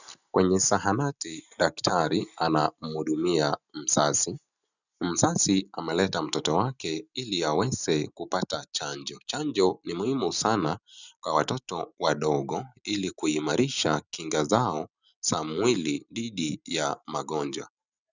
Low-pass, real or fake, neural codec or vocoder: 7.2 kHz; real; none